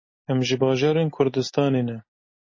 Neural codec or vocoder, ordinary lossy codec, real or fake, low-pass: none; MP3, 32 kbps; real; 7.2 kHz